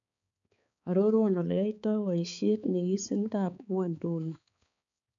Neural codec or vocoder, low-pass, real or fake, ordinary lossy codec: codec, 16 kHz, 4 kbps, X-Codec, HuBERT features, trained on balanced general audio; 7.2 kHz; fake; none